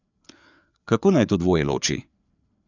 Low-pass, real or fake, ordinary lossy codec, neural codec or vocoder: 7.2 kHz; fake; none; codec, 16 kHz, 4 kbps, FreqCodec, larger model